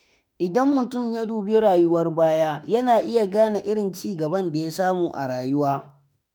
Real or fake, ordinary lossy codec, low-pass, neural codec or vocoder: fake; none; none; autoencoder, 48 kHz, 32 numbers a frame, DAC-VAE, trained on Japanese speech